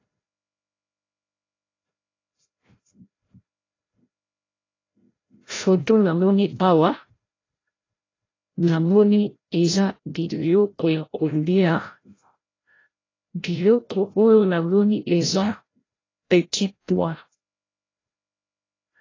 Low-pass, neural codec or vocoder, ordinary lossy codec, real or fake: 7.2 kHz; codec, 16 kHz, 0.5 kbps, FreqCodec, larger model; AAC, 32 kbps; fake